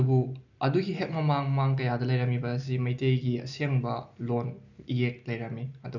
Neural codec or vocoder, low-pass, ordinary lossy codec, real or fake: none; 7.2 kHz; none; real